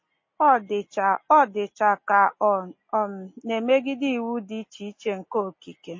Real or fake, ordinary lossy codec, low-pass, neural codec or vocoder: real; MP3, 32 kbps; 7.2 kHz; none